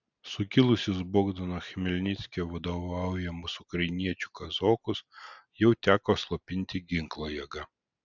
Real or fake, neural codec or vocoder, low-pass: real; none; 7.2 kHz